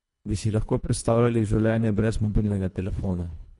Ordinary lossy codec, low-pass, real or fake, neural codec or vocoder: MP3, 48 kbps; 10.8 kHz; fake; codec, 24 kHz, 1.5 kbps, HILCodec